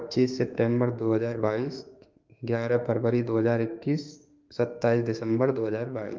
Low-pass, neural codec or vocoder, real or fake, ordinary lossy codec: 7.2 kHz; autoencoder, 48 kHz, 32 numbers a frame, DAC-VAE, trained on Japanese speech; fake; Opus, 24 kbps